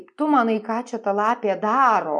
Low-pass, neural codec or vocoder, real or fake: 10.8 kHz; none; real